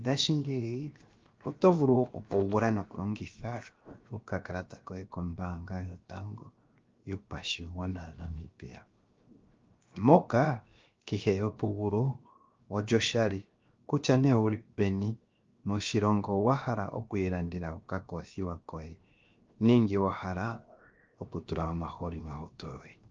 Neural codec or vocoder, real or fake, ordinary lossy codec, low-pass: codec, 16 kHz, 0.7 kbps, FocalCodec; fake; Opus, 24 kbps; 7.2 kHz